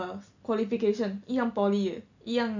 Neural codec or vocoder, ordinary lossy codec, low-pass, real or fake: none; none; 7.2 kHz; real